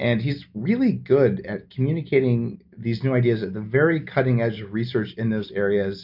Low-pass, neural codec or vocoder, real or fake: 5.4 kHz; none; real